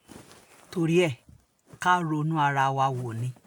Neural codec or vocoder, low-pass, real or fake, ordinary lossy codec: none; 19.8 kHz; real; MP3, 96 kbps